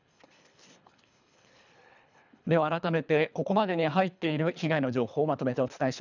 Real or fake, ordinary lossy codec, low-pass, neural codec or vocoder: fake; none; 7.2 kHz; codec, 24 kHz, 3 kbps, HILCodec